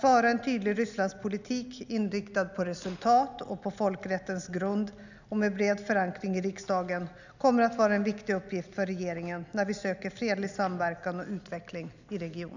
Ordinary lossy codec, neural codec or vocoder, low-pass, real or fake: none; none; 7.2 kHz; real